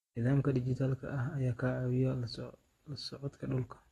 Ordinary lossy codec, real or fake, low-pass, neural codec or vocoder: AAC, 32 kbps; real; 19.8 kHz; none